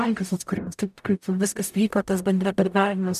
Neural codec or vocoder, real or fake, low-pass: codec, 44.1 kHz, 0.9 kbps, DAC; fake; 14.4 kHz